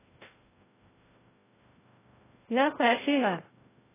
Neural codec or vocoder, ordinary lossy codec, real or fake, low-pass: codec, 16 kHz, 0.5 kbps, FreqCodec, larger model; AAC, 16 kbps; fake; 3.6 kHz